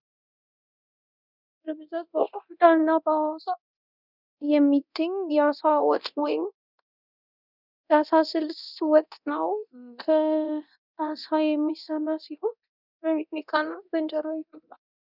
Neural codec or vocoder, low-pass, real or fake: codec, 24 kHz, 0.9 kbps, DualCodec; 5.4 kHz; fake